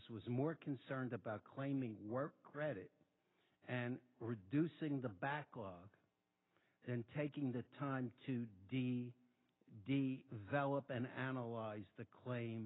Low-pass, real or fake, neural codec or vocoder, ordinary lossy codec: 7.2 kHz; real; none; AAC, 16 kbps